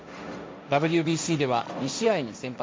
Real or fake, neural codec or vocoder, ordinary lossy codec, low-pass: fake; codec, 16 kHz, 1.1 kbps, Voila-Tokenizer; none; none